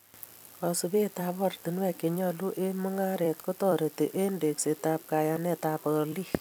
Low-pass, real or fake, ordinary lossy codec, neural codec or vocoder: none; fake; none; vocoder, 44.1 kHz, 128 mel bands every 256 samples, BigVGAN v2